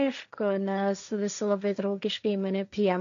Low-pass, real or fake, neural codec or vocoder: 7.2 kHz; fake; codec, 16 kHz, 1.1 kbps, Voila-Tokenizer